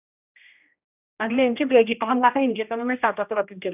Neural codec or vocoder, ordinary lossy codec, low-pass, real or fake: codec, 16 kHz, 1 kbps, X-Codec, HuBERT features, trained on general audio; none; 3.6 kHz; fake